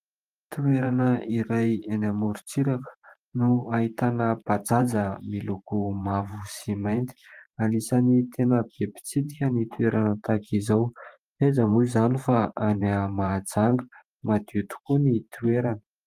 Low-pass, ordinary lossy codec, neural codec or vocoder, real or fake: 14.4 kHz; Opus, 32 kbps; vocoder, 44.1 kHz, 128 mel bands every 512 samples, BigVGAN v2; fake